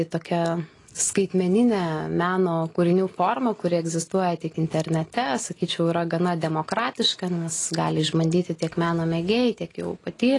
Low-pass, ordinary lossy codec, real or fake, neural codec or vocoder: 10.8 kHz; AAC, 32 kbps; real; none